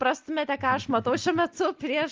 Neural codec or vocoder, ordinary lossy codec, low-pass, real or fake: none; Opus, 32 kbps; 7.2 kHz; real